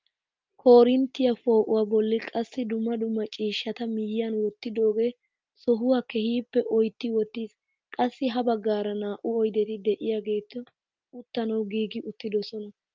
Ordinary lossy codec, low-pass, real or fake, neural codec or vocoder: Opus, 24 kbps; 7.2 kHz; real; none